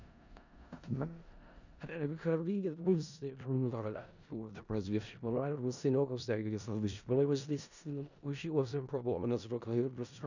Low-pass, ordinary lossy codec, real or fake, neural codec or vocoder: 7.2 kHz; none; fake; codec, 16 kHz in and 24 kHz out, 0.4 kbps, LongCat-Audio-Codec, four codebook decoder